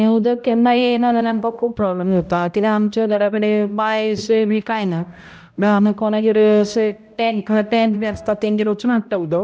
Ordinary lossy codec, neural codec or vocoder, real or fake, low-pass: none; codec, 16 kHz, 0.5 kbps, X-Codec, HuBERT features, trained on balanced general audio; fake; none